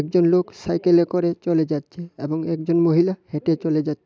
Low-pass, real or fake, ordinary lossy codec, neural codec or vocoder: none; real; none; none